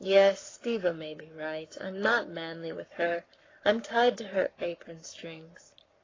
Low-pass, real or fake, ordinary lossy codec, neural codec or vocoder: 7.2 kHz; fake; AAC, 32 kbps; codec, 44.1 kHz, 7.8 kbps, Pupu-Codec